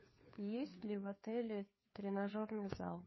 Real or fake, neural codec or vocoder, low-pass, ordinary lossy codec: fake; codec, 16 kHz, 2 kbps, FunCodec, trained on Chinese and English, 25 frames a second; 7.2 kHz; MP3, 24 kbps